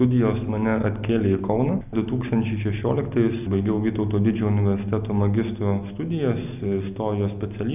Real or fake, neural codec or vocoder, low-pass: real; none; 3.6 kHz